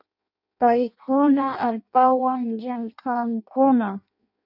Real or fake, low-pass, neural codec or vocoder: fake; 5.4 kHz; codec, 16 kHz in and 24 kHz out, 0.6 kbps, FireRedTTS-2 codec